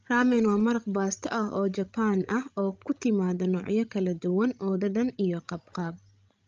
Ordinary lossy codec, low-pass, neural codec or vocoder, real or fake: Opus, 24 kbps; 7.2 kHz; codec, 16 kHz, 16 kbps, FreqCodec, larger model; fake